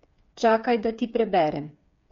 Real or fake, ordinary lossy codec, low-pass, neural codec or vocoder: fake; MP3, 48 kbps; 7.2 kHz; codec, 16 kHz, 8 kbps, FreqCodec, smaller model